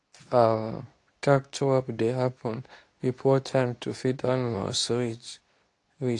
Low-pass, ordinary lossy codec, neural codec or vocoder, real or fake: 10.8 kHz; AAC, 48 kbps; codec, 24 kHz, 0.9 kbps, WavTokenizer, medium speech release version 2; fake